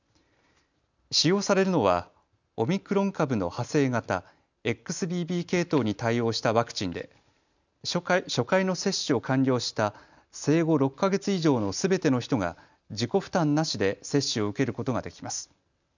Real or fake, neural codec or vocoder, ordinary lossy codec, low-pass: real; none; none; 7.2 kHz